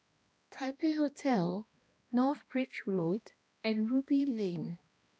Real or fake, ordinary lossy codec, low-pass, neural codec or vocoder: fake; none; none; codec, 16 kHz, 1 kbps, X-Codec, HuBERT features, trained on balanced general audio